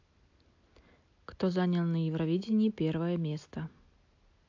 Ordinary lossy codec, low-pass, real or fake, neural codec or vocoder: AAC, 48 kbps; 7.2 kHz; real; none